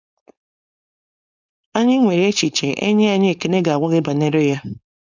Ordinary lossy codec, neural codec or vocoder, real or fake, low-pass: none; codec, 16 kHz, 4.8 kbps, FACodec; fake; 7.2 kHz